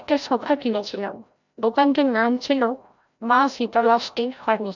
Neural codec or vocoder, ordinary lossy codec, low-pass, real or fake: codec, 16 kHz, 0.5 kbps, FreqCodec, larger model; none; 7.2 kHz; fake